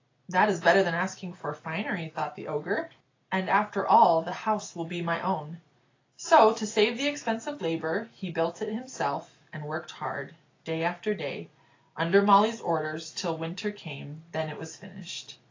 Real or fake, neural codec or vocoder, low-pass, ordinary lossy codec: real; none; 7.2 kHz; AAC, 32 kbps